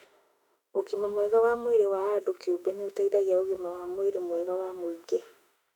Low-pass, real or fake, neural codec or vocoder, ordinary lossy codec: 19.8 kHz; fake; autoencoder, 48 kHz, 32 numbers a frame, DAC-VAE, trained on Japanese speech; none